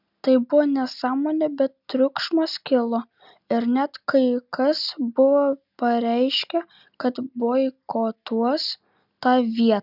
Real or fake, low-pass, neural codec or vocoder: real; 5.4 kHz; none